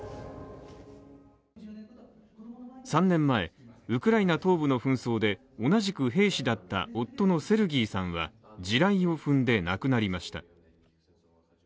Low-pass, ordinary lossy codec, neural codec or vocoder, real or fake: none; none; none; real